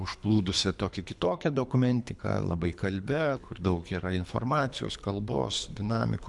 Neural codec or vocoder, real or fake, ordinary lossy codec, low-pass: codec, 24 kHz, 3 kbps, HILCodec; fake; MP3, 96 kbps; 10.8 kHz